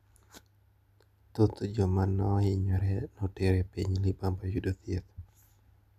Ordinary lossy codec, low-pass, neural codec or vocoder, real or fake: none; 14.4 kHz; none; real